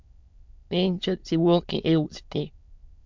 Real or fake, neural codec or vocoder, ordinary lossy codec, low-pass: fake; autoencoder, 22.05 kHz, a latent of 192 numbers a frame, VITS, trained on many speakers; MP3, 64 kbps; 7.2 kHz